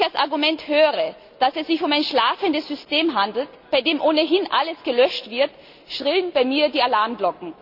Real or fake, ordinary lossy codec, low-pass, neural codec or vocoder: real; none; 5.4 kHz; none